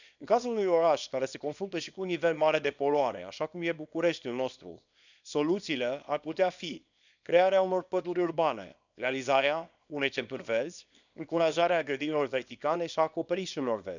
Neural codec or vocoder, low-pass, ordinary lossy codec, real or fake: codec, 24 kHz, 0.9 kbps, WavTokenizer, small release; 7.2 kHz; none; fake